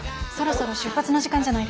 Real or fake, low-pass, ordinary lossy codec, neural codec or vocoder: real; none; none; none